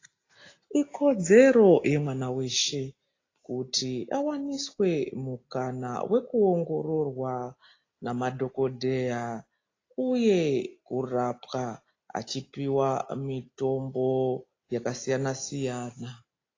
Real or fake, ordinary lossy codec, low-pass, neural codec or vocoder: real; AAC, 32 kbps; 7.2 kHz; none